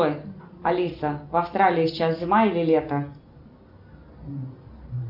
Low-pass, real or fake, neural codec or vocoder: 5.4 kHz; real; none